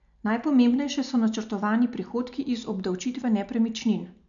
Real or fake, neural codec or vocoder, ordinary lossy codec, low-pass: real; none; none; 7.2 kHz